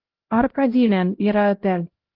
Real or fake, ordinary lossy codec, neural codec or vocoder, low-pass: fake; Opus, 16 kbps; codec, 16 kHz, 0.5 kbps, X-Codec, HuBERT features, trained on LibriSpeech; 5.4 kHz